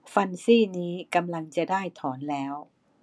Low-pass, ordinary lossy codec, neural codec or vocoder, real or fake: none; none; none; real